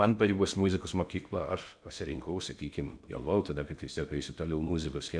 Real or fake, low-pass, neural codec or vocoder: fake; 9.9 kHz; codec, 16 kHz in and 24 kHz out, 0.6 kbps, FocalCodec, streaming, 2048 codes